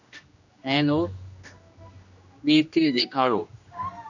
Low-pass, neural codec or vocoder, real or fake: 7.2 kHz; codec, 16 kHz, 1 kbps, X-Codec, HuBERT features, trained on general audio; fake